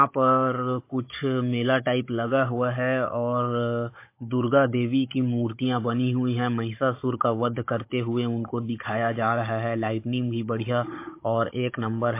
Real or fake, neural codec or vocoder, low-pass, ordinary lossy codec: fake; codec, 16 kHz, 16 kbps, FunCodec, trained on Chinese and English, 50 frames a second; 3.6 kHz; MP3, 24 kbps